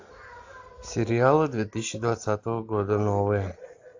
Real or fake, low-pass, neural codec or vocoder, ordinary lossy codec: real; 7.2 kHz; none; AAC, 48 kbps